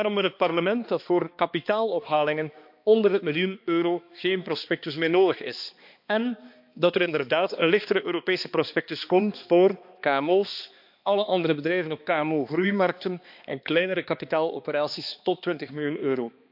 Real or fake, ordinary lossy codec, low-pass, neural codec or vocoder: fake; MP3, 48 kbps; 5.4 kHz; codec, 16 kHz, 2 kbps, X-Codec, HuBERT features, trained on balanced general audio